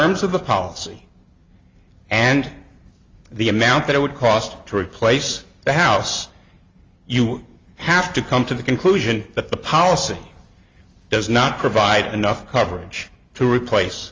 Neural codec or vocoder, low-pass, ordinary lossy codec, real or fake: none; 7.2 kHz; Opus, 32 kbps; real